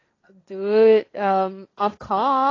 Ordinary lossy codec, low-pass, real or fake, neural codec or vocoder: AAC, 32 kbps; 7.2 kHz; fake; codec, 16 kHz, 1.1 kbps, Voila-Tokenizer